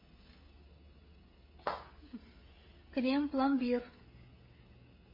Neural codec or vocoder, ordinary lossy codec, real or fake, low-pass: codec, 16 kHz, 16 kbps, FreqCodec, smaller model; MP3, 24 kbps; fake; 5.4 kHz